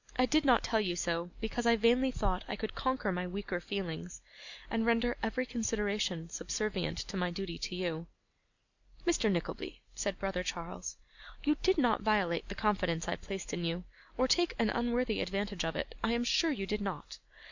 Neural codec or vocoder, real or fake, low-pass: none; real; 7.2 kHz